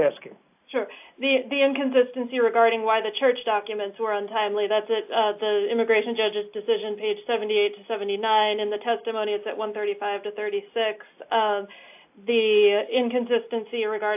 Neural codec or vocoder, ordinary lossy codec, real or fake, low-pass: none; AAC, 32 kbps; real; 3.6 kHz